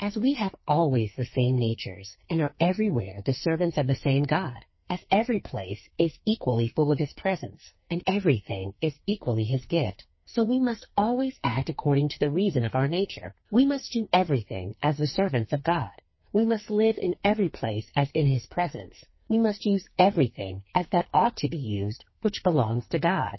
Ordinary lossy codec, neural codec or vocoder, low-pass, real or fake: MP3, 24 kbps; codec, 44.1 kHz, 2.6 kbps, SNAC; 7.2 kHz; fake